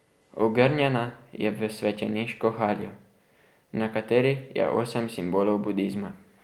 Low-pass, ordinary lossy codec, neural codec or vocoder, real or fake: 19.8 kHz; Opus, 32 kbps; none; real